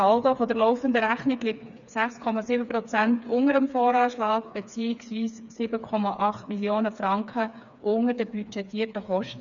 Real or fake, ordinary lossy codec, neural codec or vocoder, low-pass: fake; none; codec, 16 kHz, 4 kbps, FreqCodec, smaller model; 7.2 kHz